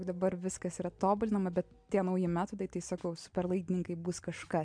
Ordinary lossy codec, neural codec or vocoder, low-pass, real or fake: MP3, 64 kbps; none; 9.9 kHz; real